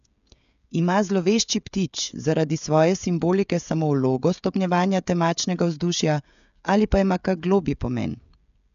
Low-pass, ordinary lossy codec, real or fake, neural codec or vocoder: 7.2 kHz; none; fake; codec, 16 kHz, 16 kbps, FreqCodec, smaller model